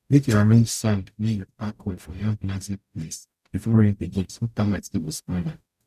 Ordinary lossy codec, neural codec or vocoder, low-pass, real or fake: none; codec, 44.1 kHz, 0.9 kbps, DAC; 14.4 kHz; fake